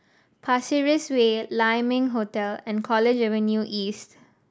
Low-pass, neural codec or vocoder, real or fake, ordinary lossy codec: none; none; real; none